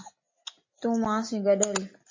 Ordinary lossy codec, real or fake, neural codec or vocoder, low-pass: MP3, 32 kbps; real; none; 7.2 kHz